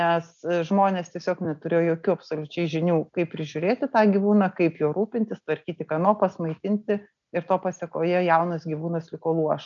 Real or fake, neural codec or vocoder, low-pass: real; none; 7.2 kHz